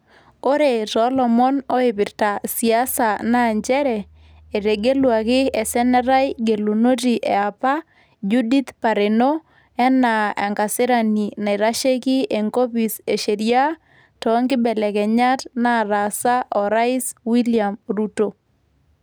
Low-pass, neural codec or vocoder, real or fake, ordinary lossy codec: none; none; real; none